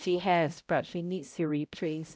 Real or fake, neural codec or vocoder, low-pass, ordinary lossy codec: fake; codec, 16 kHz, 0.5 kbps, X-Codec, HuBERT features, trained on balanced general audio; none; none